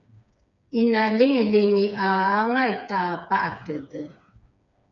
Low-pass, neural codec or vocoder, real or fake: 7.2 kHz; codec, 16 kHz, 4 kbps, FreqCodec, smaller model; fake